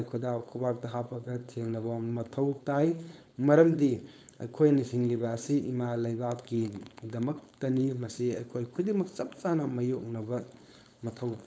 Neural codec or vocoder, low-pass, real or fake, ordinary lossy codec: codec, 16 kHz, 4.8 kbps, FACodec; none; fake; none